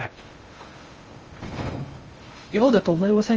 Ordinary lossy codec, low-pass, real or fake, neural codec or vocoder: Opus, 24 kbps; 7.2 kHz; fake; codec, 16 kHz in and 24 kHz out, 0.6 kbps, FocalCodec, streaming, 2048 codes